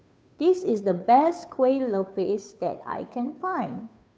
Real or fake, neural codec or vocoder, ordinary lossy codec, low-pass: fake; codec, 16 kHz, 2 kbps, FunCodec, trained on Chinese and English, 25 frames a second; none; none